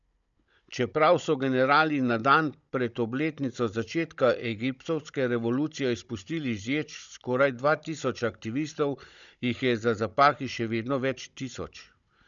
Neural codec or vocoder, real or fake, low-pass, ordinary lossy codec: codec, 16 kHz, 16 kbps, FunCodec, trained on Chinese and English, 50 frames a second; fake; 7.2 kHz; none